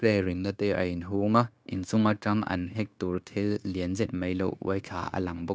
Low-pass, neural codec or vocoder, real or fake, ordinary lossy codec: none; codec, 16 kHz, 0.9 kbps, LongCat-Audio-Codec; fake; none